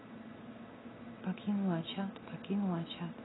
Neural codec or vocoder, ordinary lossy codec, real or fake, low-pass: none; AAC, 16 kbps; real; 7.2 kHz